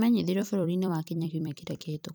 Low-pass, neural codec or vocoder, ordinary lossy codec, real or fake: none; vocoder, 44.1 kHz, 128 mel bands every 256 samples, BigVGAN v2; none; fake